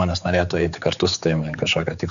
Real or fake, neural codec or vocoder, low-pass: fake; codec, 16 kHz, 4 kbps, X-Codec, HuBERT features, trained on general audio; 7.2 kHz